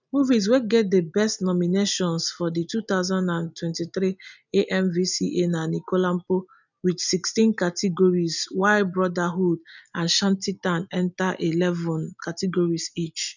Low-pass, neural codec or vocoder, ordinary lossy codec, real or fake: 7.2 kHz; none; none; real